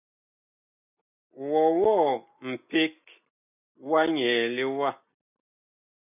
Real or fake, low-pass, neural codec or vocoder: real; 3.6 kHz; none